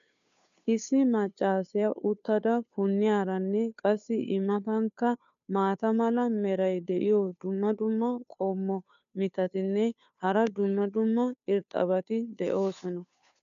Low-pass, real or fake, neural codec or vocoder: 7.2 kHz; fake; codec, 16 kHz, 2 kbps, FunCodec, trained on Chinese and English, 25 frames a second